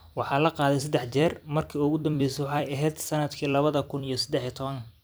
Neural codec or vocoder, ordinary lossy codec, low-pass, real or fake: vocoder, 44.1 kHz, 128 mel bands every 256 samples, BigVGAN v2; none; none; fake